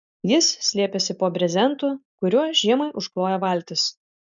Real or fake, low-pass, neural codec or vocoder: real; 7.2 kHz; none